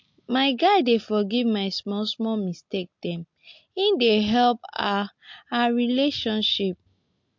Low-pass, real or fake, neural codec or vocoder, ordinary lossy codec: 7.2 kHz; real; none; MP3, 48 kbps